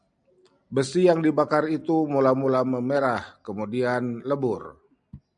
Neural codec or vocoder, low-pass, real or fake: none; 9.9 kHz; real